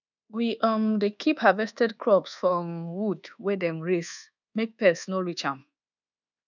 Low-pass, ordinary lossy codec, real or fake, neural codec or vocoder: 7.2 kHz; none; fake; codec, 24 kHz, 1.2 kbps, DualCodec